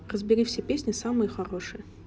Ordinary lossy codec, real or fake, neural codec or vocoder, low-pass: none; real; none; none